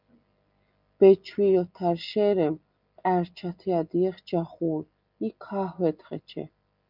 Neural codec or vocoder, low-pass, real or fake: none; 5.4 kHz; real